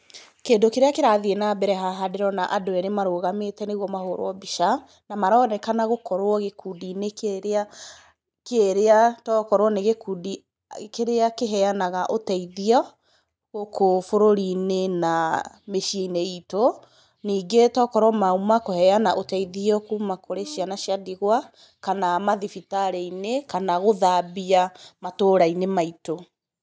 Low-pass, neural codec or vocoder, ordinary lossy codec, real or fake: none; none; none; real